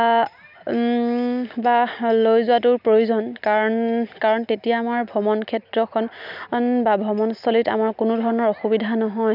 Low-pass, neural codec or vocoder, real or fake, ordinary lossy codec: 5.4 kHz; none; real; none